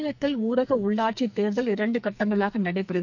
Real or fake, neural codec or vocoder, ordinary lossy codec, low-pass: fake; codec, 44.1 kHz, 2.6 kbps, SNAC; none; 7.2 kHz